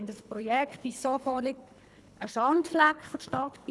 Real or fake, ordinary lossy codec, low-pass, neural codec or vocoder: fake; none; none; codec, 24 kHz, 3 kbps, HILCodec